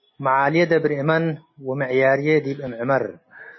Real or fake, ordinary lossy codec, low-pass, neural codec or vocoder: real; MP3, 24 kbps; 7.2 kHz; none